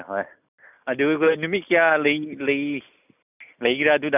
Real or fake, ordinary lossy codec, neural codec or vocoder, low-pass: real; none; none; 3.6 kHz